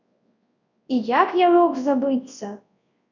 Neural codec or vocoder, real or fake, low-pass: codec, 24 kHz, 0.9 kbps, WavTokenizer, large speech release; fake; 7.2 kHz